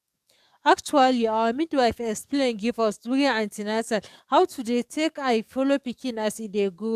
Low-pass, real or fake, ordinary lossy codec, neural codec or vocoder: 14.4 kHz; fake; AAC, 96 kbps; codec, 44.1 kHz, 7.8 kbps, DAC